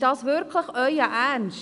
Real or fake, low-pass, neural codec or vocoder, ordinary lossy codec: real; 10.8 kHz; none; none